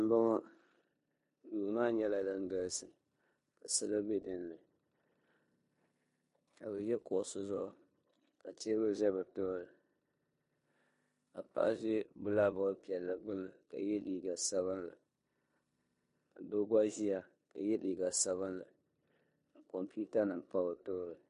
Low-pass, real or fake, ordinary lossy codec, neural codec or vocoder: 10.8 kHz; fake; MP3, 48 kbps; codec, 16 kHz in and 24 kHz out, 0.9 kbps, LongCat-Audio-Codec, four codebook decoder